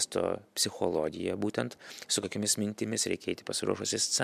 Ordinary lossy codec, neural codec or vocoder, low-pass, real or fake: MP3, 96 kbps; none; 14.4 kHz; real